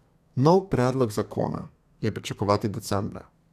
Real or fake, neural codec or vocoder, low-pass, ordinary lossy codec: fake; codec, 32 kHz, 1.9 kbps, SNAC; 14.4 kHz; none